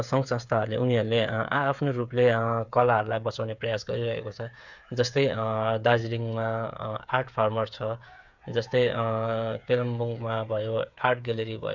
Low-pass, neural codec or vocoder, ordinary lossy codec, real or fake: 7.2 kHz; codec, 16 kHz, 8 kbps, FreqCodec, smaller model; none; fake